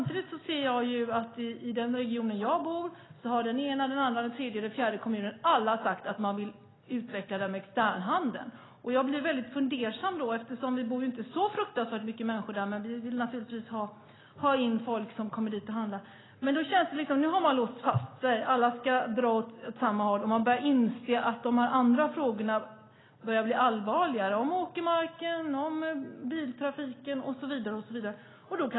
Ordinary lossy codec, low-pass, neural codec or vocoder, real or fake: AAC, 16 kbps; 7.2 kHz; none; real